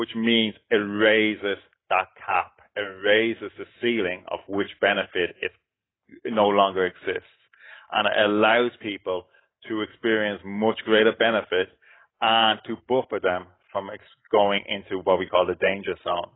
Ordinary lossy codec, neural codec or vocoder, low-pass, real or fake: AAC, 16 kbps; none; 7.2 kHz; real